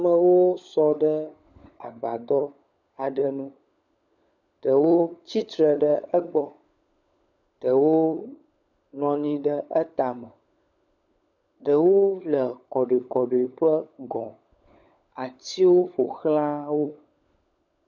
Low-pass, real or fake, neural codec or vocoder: 7.2 kHz; fake; codec, 16 kHz, 16 kbps, FunCodec, trained on LibriTTS, 50 frames a second